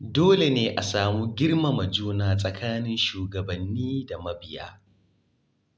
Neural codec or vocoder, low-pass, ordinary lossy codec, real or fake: none; none; none; real